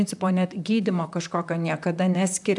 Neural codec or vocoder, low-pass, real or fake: vocoder, 44.1 kHz, 128 mel bands, Pupu-Vocoder; 10.8 kHz; fake